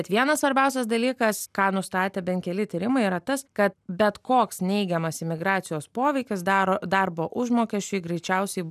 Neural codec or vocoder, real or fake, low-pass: none; real; 14.4 kHz